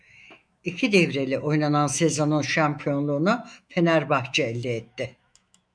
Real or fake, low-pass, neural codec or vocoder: fake; 9.9 kHz; autoencoder, 48 kHz, 128 numbers a frame, DAC-VAE, trained on Japanese speech